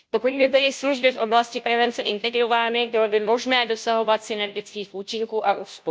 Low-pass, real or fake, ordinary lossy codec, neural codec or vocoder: none; fake; none; codec, 16 kHz, 0.5 kbps, FunCodec, trained on Chinese and English, 25 frames a second